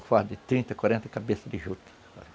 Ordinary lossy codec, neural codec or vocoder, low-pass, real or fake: none; none; none; real